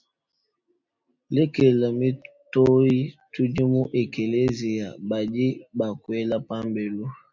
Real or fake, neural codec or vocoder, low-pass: real; none; 7.2 kHz